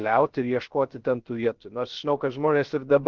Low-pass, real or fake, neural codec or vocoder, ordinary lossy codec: 7.2 kHz; fake; codec, 16 kHz, 0.3 kbps, FocalCodec; Opus, 16 kbps